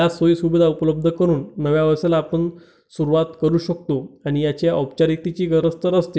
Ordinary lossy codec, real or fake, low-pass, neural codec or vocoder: none; real; none; none